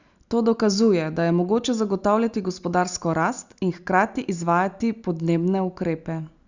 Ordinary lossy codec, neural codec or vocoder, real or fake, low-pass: Opus, 64 kbps; none; real; 7.2 kHz